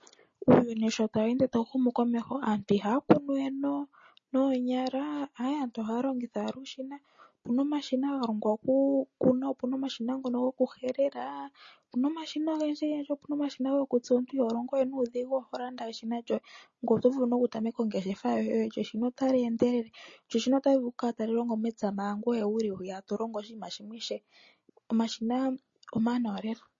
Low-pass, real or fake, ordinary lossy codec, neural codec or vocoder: 7.2 kHz; real; MP3, 32 kbps; none